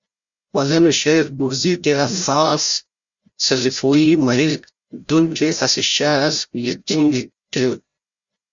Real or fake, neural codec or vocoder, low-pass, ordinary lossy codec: fake; codec, 16 kHz, 0.5 kbps, FreqCodec, larger model; 7.2 kHz; Opus, 64 kbps